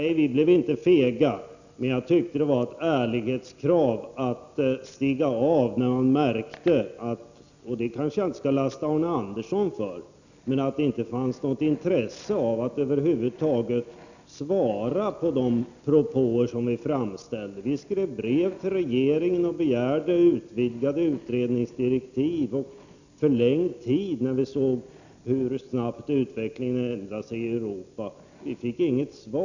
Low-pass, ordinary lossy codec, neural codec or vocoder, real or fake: 7.2 kHz; none; none; real